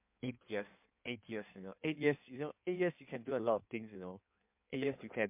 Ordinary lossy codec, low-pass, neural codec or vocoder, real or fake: MP3, 32 kbps; 3.6 kHz; codec, 16 kHz in and 24 kHz out, 1.1 kbps, FireRedTTS-2 codec; fake